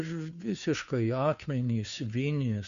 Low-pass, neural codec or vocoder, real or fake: 7.2 kHz; codec, 16 kHz, 2 kbps, FunCodec, trained on Chinese and English, 25 frames a second; fake